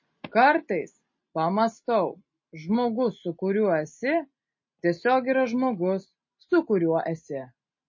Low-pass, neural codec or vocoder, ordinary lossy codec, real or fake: 7.2 kHz; none; MP3, 32 kbps; real